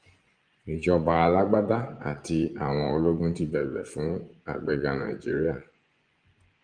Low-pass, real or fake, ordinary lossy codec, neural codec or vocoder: 9.9 kHz; fake; Opus, 32 kbps; vocoder, 24 kHz, 100 mel bands, Vocos